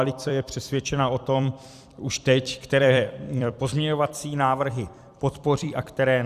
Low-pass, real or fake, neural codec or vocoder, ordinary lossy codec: 14.4 kHz; fake; vocoder, 44.1 kHz, 128 mel bands every 256 samples, BigVGAN v2; Opus, 64 kbps